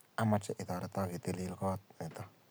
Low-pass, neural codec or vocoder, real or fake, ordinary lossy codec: none; none; real; none